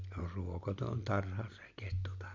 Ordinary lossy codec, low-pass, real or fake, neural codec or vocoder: MP3, 48 kbps; 7.2 kHz; real; none